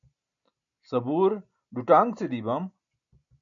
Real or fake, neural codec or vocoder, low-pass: real; none; 7.2 kHz